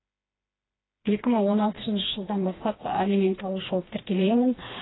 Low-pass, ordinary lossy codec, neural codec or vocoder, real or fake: 7.2 kHz; AAC, 16 kbps; codec, 16 kHz, 2 kbps, FreqCodec, smaller model; fake